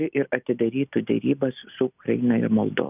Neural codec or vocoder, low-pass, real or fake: none; 3.6 kHz; real